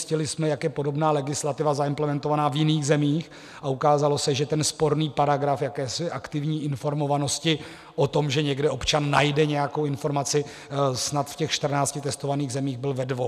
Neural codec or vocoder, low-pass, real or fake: none; 14.4 kHz; real